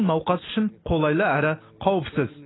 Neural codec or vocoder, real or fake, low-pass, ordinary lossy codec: none; real; 7.2 kHz; AAC, 16 kbps